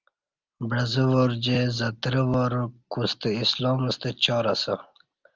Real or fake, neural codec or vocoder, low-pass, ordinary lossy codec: real; none; 7.2 kHz; Opus, 24 kbps